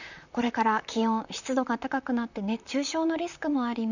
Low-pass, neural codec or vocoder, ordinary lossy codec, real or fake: 7.2 kHz; vocoder, 44.1 kHz, 128 mel bands, Pupu-Vocoder; none; fake